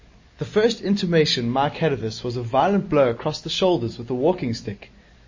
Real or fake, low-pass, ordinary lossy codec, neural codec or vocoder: real; 7.2 kHz; MP3, 32 kbps; none